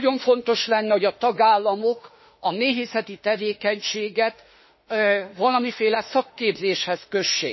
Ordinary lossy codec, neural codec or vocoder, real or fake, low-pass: MP3, 24 kbps; autoencoder, 48 kHz, 32 numbers a frame, DAC-VAE, trained on Japanese speech; fake; 7.2 kHz